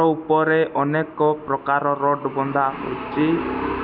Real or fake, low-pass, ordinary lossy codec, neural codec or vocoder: real; 5.4 kHz; none; none